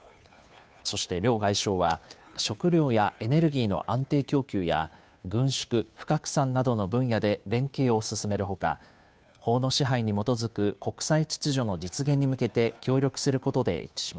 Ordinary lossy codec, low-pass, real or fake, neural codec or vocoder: none; none; fake; codec, 16 kHz, 2 kbps, FunCodec, trained on Chinese and English, 25 frames a second